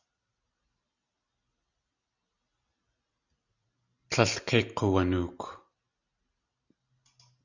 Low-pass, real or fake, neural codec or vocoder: 7.2 kHz; real; none